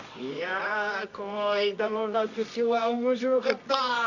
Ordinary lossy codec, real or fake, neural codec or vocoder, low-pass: none; fake; codec, 24 kHz, 0.9 kbps, WavTokenizer, medium music audio release; 7.2 kHz